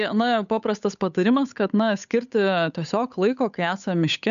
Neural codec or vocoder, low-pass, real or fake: codec, 16 kHz, 8 kbps, FunCodec, trained on Chinese and English, 25 frames a second; 7.2 kHz; fake